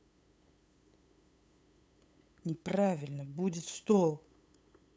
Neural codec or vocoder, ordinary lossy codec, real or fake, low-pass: codec, 16 kHz, 8 kbps, FunCodec, trained on LibriTTS, 25 frames a second; none; fake; none